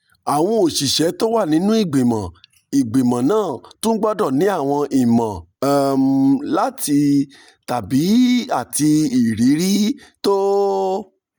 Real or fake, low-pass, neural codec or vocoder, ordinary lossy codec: real; none; none; none